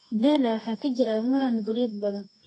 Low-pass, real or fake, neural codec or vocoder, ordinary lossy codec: 10.8 kHz; fake; codec, 24 kHz, 0.9 kbps, WavTokenizer, medium music audio release; AAC, 32 kbps